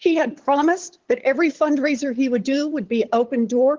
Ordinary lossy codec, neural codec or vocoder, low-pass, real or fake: Opus, 16 kbps; codec, 16 kHz, 8 kbps, FunCodec, trained on LibriTTS, 25 frames a second; 7.2 kHz; fake